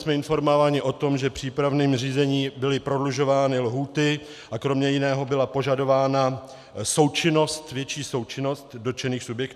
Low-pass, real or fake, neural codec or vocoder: 14.4 kHz; real; none